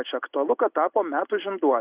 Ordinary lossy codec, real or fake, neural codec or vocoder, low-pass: Opus, 64 kbps; real; none; 3.6 kHz